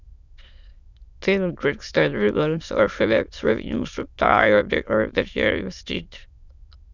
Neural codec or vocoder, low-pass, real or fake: autoencoder, 22.05 kHz, a latent of 192 numbers a frame, VITS, trained on many speakers; 7.2 kHz; fake